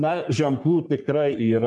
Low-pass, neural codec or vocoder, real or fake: 10.8 kHz; codec, 44.1 kHz, 3.4 kbps, Pupu-Codec; fake